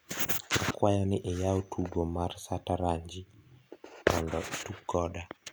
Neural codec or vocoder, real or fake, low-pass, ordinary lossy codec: none; real; none; none